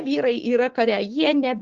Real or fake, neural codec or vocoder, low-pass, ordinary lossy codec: fake; codec, 16 kHz, 6 kbps, DAC; 7.2 kHz; Opus, 16 kbps